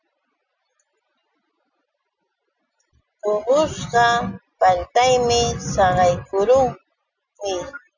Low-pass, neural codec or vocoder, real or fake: 7.2 kHz; none; real